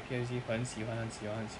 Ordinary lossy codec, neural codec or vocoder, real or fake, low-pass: none; none; real; 10.8 kHz